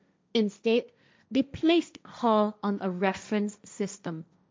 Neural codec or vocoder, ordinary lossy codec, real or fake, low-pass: codec, 16 kHz, 1.1 kbps, Voila-Tokenizer; none; fake; none